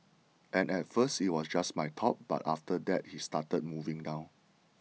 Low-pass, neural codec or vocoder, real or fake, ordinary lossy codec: none; none; real; none